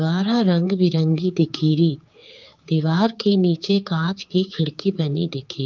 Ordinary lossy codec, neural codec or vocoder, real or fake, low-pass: Opus, 24 kbps; codec, 16 kHz, 4 kbps, X-Codec, HuBERT features, trained on general audio; fake; 7.2 kHz